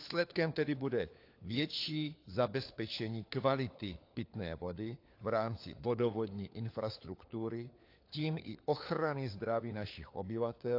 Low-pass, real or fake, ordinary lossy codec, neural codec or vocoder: 5.4 kHz; fake; AAC, 32 kbps; codec, 16 kHz, 4 kbps, FunCodec, trained on LibriTTS, 50 frames a second